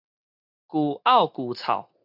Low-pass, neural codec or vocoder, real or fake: 5.4 kHz; none; real